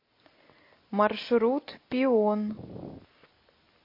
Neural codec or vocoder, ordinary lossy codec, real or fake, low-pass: none; MP3, 32 kbps; real; 5.4 kHz